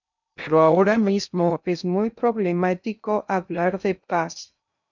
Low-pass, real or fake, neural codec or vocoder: 7.2 kHz; fake; codec, 16 kHz in and 24 kHz out, 0.6 kbps, FocalCodec, streaming, 2048 codes